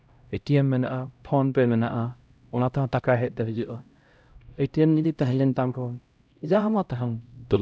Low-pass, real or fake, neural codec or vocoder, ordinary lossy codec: none; fake; codec, 16 kHz, 0.5 kbps, X-Codec, HuBERT features, trained on LibriSpeech; none